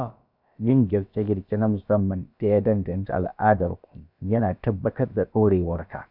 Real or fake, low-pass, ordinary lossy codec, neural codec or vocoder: fake; 5.4 kHz; none; codec, 16 kHz, about 1 kbps, DyCAST, with the encoder's durations